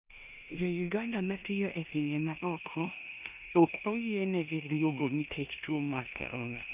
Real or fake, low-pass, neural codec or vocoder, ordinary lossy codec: fake; 3.6 kHz; codec, 16 kHz in and 24 kHz out, 0.9 kbps, LongCat-Audio-Codec, four codebook decoder; none